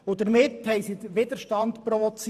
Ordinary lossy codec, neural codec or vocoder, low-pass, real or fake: none; vocoder, 44.1 kHz, 128 mel bands every 512 samples, BigVGAN v2; 14.4 kHz; fake